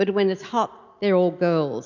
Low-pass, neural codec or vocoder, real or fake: 7.2 kHz; none; real